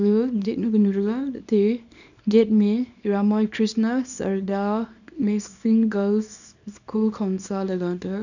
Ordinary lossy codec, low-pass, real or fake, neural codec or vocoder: none; 7.2 kHz; fake; codec, 24 kHz, 0.9 kbps, WavTokenizer, small release